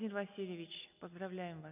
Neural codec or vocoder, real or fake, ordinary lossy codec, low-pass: none; real; none; 3.6 kHz